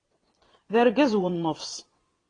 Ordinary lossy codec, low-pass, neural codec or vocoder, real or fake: AAC, 32 kbps; 9.9 kHz; vocoder, 22.05 kHz, 80 mel bands, Vocos; fake